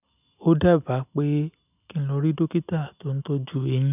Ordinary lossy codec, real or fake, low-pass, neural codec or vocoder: none; real; 3.6 kHz; none